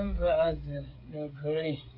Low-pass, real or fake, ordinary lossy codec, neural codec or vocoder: 5.4 kHz; fake; AAC, 32 kbps; codec, 16 kHz, 8 kbps, FreqCodec, smaller model